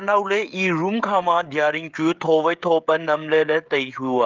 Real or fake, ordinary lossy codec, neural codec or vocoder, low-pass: fake; Opus, 24 kbps; codec, 16 kHz, 16 kbps, FreqCodec, smaller model; 7.2 kHz